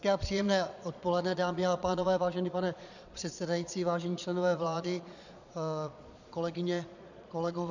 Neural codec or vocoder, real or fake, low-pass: vocoder, 22.05 kHz, 80 mel bands, Vocos; fake; 7.2 kHz